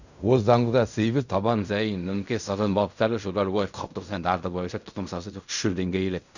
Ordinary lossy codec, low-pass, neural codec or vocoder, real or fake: none; 7.2 kHz; codec, 16 kHz in and 24 kHz out, 0.4 kbps, LongCat-Audio-Codec, fine tuned four codebook decoder; fake